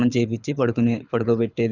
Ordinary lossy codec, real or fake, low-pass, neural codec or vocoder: none; fake; 7.2 kHz; codec, 24 kHz, 6 kbps, HILCodec